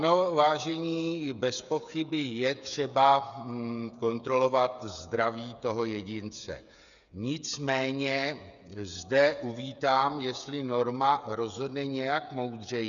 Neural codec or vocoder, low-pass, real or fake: codec, 16 kHz, 8 kbps, FreqCodec, smaller model; 7.2 kHz; fake